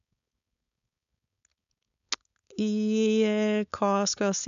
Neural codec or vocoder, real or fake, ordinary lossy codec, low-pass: codec, 16 kHz, 4.8 kbps, FACodec; fake; none; 7.2 kHz